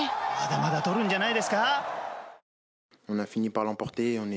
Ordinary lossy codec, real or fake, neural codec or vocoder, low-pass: none; real; none; none